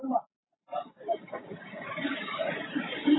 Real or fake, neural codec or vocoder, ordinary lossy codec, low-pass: real; none; AAC, 16 kbps; 7.2 kHz